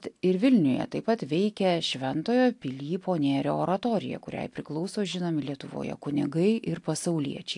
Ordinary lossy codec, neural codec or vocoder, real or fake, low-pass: AAC, 64 kbps; none; real; 10.8 kHz